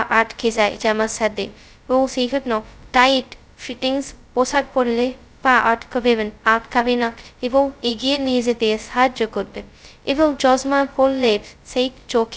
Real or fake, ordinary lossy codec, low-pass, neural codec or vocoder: fake; none; none; codec, 16 kHz, 0.2 kbps, FocalCodec